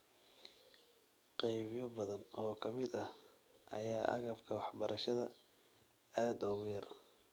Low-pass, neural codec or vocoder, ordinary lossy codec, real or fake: none; codec, 44.1 kHz, 7.8 kbps, DAC; none; fake